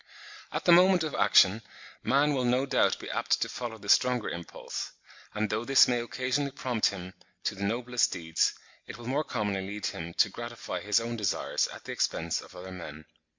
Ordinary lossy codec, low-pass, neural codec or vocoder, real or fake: MP3, 64 kbps; 7.2 kHz; none; real